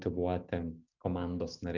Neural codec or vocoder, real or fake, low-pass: none; real; 7.2 kHz